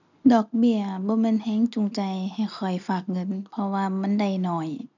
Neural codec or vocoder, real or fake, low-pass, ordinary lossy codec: none; real; 7.2 kHz; none